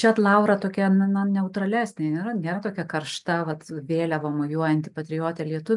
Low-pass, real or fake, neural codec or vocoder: 10.8 kHz; real; none